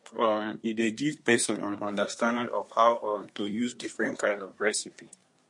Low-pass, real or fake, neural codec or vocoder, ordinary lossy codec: 10.8 kHz; fake; codec, 24 kHz, 1 kbps, SNAC; MP3, 48 kbps